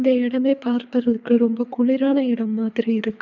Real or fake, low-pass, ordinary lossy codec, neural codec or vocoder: fake; 7.2 kHz; none; codec, 24 kHz, 3 kbps, HILCodec